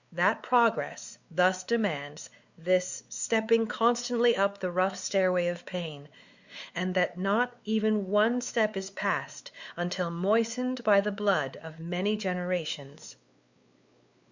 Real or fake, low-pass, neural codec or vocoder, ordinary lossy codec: fake; 7.2 kHz; codec, 16 kHz, 4 kbps, X-Codec, WavLM features, trained on Multilingual LibriSpeech; Opus, 64 kbps